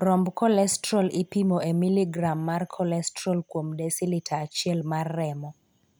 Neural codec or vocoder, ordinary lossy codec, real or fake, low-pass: none; none; real; none